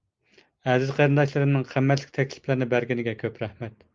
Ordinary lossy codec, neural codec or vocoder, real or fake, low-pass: Opus, 32 kbps; none; real; 7.2 kHz